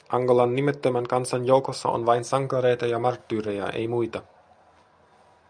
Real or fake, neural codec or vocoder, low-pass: real; none; 9.9 kHz